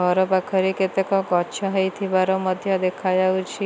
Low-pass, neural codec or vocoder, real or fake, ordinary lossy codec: none; none; real; none